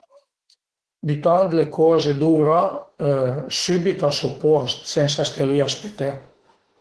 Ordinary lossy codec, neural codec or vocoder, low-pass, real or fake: Opus, 16 kbps; autoencoder, 48 kHz, 32 numbers a frame, DAC-VAE, trained on Japanese speech; 10.8 kHz; fake